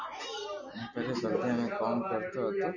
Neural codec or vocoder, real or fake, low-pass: none; real; 7.2 kHz